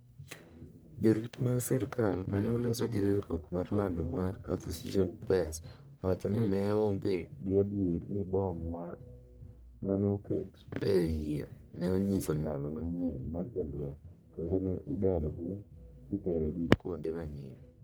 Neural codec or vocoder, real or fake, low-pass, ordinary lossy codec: codec, 44.1 kHz, 1.7 kbps, Pupu-Codec; fake; none; none